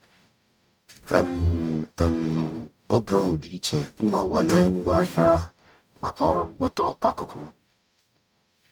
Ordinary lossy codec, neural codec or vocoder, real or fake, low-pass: none; codec, 44.1 kHz, 0.9 kbps, DAC; fake; 19.8 kHz